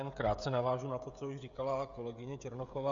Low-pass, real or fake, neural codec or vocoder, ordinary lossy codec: 7.2 kHz; fake; codec, 16 kHz, 16 kbps, FreqCodec, smaller model; Opus, 64 kbps